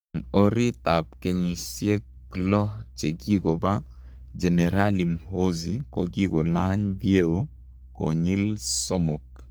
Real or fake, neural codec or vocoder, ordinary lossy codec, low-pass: fake; codec, 44.1 kHz, 3.4 kbps, Pupu-Codec; none; none